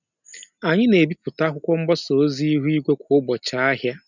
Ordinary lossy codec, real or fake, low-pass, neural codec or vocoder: none; real; 7.2 kHz; none